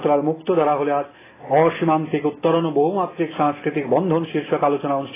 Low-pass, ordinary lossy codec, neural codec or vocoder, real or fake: 3.6 kHz; AAC, 16 kbps; none; real